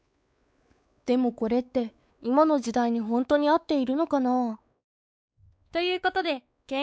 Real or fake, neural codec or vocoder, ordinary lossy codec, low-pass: fake; codec, 16 kHz, 4 kbps, X-Codec, WavLM features, trained on Multilingual LibriSpeech; none; none